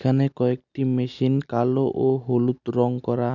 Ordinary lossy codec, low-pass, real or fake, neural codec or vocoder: none; 7.2 kHz; real; none